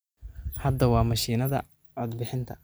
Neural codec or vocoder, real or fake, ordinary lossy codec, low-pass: none; real; none; none